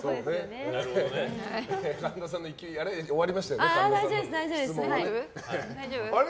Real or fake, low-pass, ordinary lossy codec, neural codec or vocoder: real; none; none; none